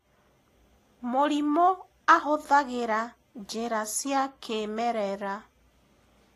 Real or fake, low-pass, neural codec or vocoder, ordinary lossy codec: real; 14.4 kHz; none; AAC, 48 kbps